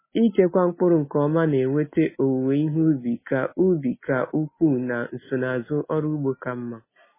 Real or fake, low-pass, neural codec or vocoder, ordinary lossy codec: real; 3.6 kHz; none; MP3, 16 kbps